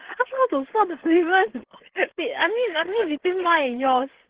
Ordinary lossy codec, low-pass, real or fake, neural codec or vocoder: Opus, 16 kbps; 3.6 kHz; fake; codec, 16 kHz, 8 kbps, FreqCodec, larger model